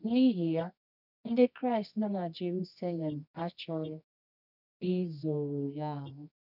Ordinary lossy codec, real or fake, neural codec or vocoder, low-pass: none; fake; codec, 24 kHz, 0.9 kbps, WavTokenizer, medium music audio release; 5.4 kHz